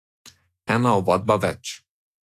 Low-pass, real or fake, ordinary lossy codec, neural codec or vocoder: 14.4 kHz; fake; AAC, 64 kbps; autoencoder, 48 kHz, 128 numbers a frame, DAC-VAE, trained on Japanese speech